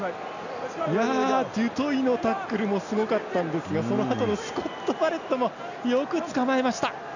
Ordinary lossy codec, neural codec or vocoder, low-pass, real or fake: none; none; 7.2 kHz; real